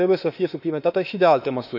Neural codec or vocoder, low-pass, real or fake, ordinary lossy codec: autoencoder, 48 kHz, 32 numbers a frame, DAC-VAE, trained on Japanese speech; 5.4 kHz; fake; none